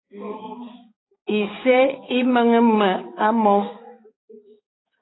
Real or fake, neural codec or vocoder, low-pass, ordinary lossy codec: fake; codec, 16 kHz, 4 kbps, X-Codec, HuBERT features, trained on balanced general audio; 7.2 kHz; AAC, 16 kbps